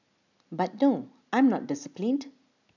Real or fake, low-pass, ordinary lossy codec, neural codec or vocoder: real; 7.2 kHz; none; none